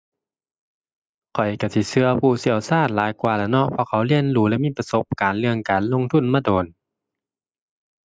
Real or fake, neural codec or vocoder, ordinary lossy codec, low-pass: real; none; none; none